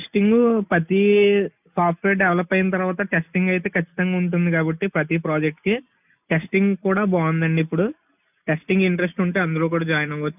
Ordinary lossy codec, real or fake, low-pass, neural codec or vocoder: none; real; 3.6 kHz; none